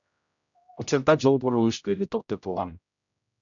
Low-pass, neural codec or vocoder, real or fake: 7.2 kHz; codec, 16 kHz, 0.5 kbps, X-Codec, HuBERT features, trained on general audio; fake